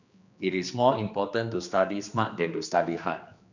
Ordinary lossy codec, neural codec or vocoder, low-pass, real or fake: none; codec, 16 kHz, 2 kbps, X-Codec, HuBERT features, trained on general audio; 7.2 kHz; fake